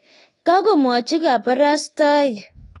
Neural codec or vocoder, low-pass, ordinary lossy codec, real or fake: codec, 24 kHz, 1.2 kbps, DualCodec; 10.8 kHz; AAC, 32 kbps; fake